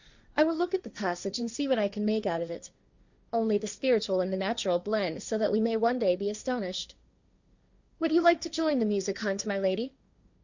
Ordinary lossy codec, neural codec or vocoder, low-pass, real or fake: Opus, 64 kbps; codec, 16 kHz, 1.1 kbps, Voila-Tokenizer; 7.2 kHz; fake